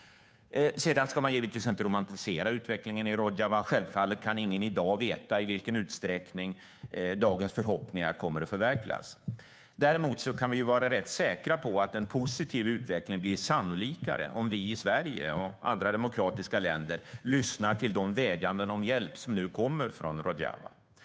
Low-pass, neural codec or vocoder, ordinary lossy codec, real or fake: none; codec, 16 kHz, 2 kbps, FunCodec, trained on Chinese and English, 25 frames a second; none; fake